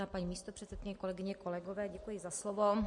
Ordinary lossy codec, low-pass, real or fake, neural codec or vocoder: MP3, 48 kbps; 10.8 kHz; real; none